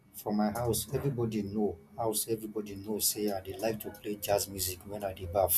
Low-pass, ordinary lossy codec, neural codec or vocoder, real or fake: 14.4 kHz; none; none; real